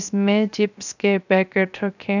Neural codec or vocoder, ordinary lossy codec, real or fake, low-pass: codec, 16 kHz, 0.3 kbps, FocalCodec; none; fake; 7.2 kHz